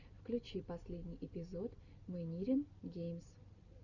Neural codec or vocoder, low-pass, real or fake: none; 7.2 kHz; real